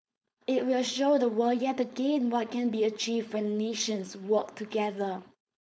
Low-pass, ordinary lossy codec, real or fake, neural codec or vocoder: none; none; fake; codec, 16 kHz, 4.8 kbps, FACodec